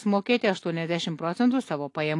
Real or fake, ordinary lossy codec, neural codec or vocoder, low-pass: real; AAC, 48 kbps; none; 10.8 kHz